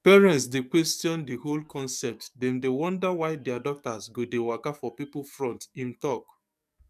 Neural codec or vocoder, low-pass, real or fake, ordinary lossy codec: codec, 44.1 kHz, 7.8 kbps, DAC; 14.4 kHz; fake; none